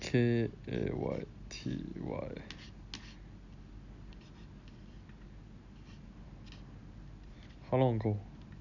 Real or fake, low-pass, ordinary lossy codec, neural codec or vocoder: real; 7.2 kHz; none; none